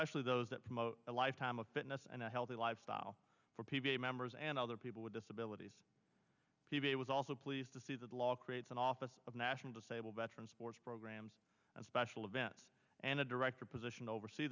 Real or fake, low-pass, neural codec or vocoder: real; 7.2 kHz; none